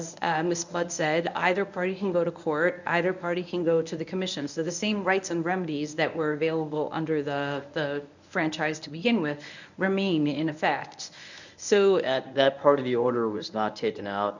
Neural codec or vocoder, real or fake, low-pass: codec, 24 kHz, 0.9 kbps, WavTokenizer, medium speech release version 1; fake; 7.2 kHz